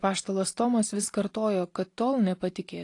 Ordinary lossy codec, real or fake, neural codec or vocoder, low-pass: AAC, 48 kbps; real; none; 10.8 kHz